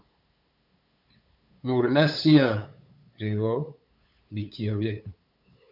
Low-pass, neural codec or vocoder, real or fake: 5.4 kHz; codec, 16 kHz, 8 kbps, FunCodec, trained on LibriTTS, 25 frames a second; fake